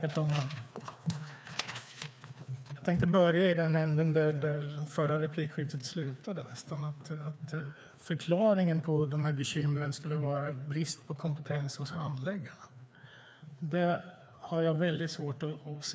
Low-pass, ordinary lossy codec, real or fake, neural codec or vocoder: none; none; fake; codec, 16 kHz, 2 kbps, FreqCodec, larger model